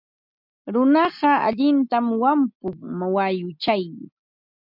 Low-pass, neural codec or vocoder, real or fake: 5.4 kHz; none; real